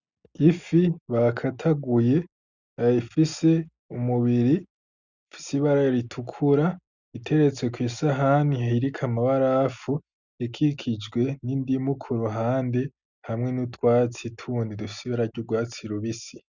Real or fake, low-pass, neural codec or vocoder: real; 7.2 kHz; none